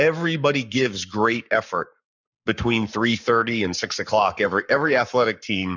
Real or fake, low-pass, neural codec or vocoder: fake; 7.2 kHz; codec, 44.1 kHz, 7.8 kbps, Pupu-Codec